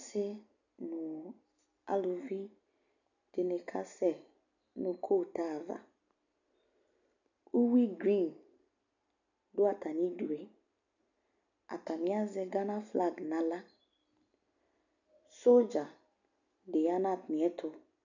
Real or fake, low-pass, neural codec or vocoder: real; 7.2 kHz; none